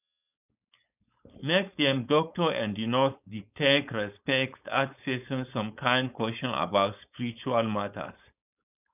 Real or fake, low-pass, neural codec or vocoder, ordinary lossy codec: fake; 3.6 kHz; codec, 16 kHz, 4.8 kbps, FACodec; none